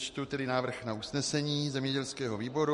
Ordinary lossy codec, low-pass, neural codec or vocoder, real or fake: MP3, 48 kbps; 14.4 kHz; none; real